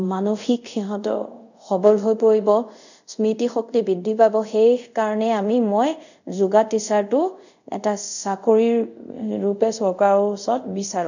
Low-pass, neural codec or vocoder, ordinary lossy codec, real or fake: 7.2 kHz; codec, 24 kHz, 0.5 kbps, DualCodec; none; fake